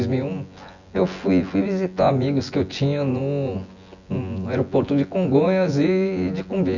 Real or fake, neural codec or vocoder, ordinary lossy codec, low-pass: fake; vocoder, 24 kHz, 100 mel bands, Vocos; none; 7.2 kHz